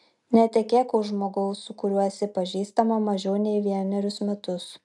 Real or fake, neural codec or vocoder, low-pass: real; none; 10.8 kHz